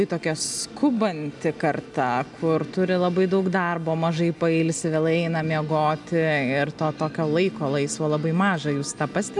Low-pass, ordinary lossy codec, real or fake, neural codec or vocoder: 10.8 kHz; MP3, 96 kbps; real; none